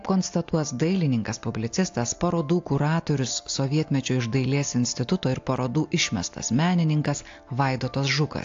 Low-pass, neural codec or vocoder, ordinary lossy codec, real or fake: 7.2 kHz; none; AAC, 48 kbps; real